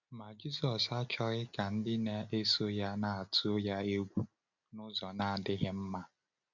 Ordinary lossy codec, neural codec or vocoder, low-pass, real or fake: none; none; 7.2 kHz; real